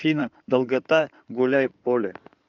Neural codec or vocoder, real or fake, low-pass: codec, 16 kHz in and 24 kHz out, 2.2 kbps, FireRedTTS-2 codec; fake; 7.2 kHz